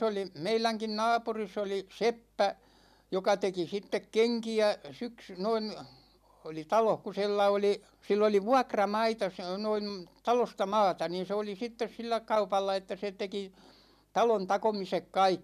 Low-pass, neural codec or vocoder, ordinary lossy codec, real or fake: 14.4 kHz; none; none; real